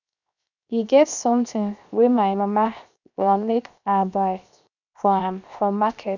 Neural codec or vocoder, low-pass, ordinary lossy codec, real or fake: codec, 16 kHz, 0.7 kbps, FocalCodec; 7.2 kHz; none; fake